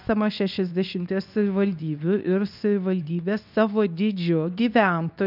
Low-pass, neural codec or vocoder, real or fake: 5.4 kHz; codec, 24 kHz, 0.9 kbps, WavTokenizer, medium speech release version 2; fake